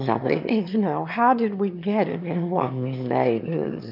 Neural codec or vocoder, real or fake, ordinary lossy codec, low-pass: autoencoder, 22.05 kHz, a latent of 192 numbers a frame, VITS, trained on one speaker; fake; MP3, 48 kbps; 5.4 kHz